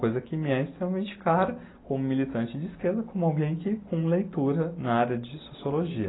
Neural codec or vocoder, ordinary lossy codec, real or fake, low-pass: none; AAC, 16 kbps; real; 7.2 kHz